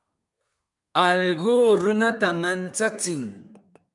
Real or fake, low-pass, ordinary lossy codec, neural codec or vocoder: fake; 10.8 kHz; MP3, 96 kbps; codec, 24 kHz, 1 kbps, SNAC